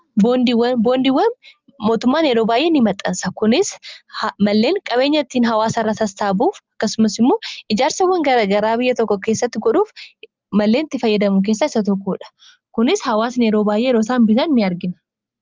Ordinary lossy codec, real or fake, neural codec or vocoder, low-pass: Opus, 32 kbps; real; none; 7.2 kHz